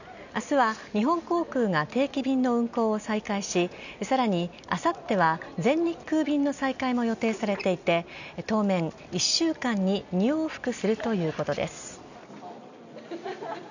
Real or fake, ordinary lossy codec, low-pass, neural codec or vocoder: real; none; 7.2 kHz; none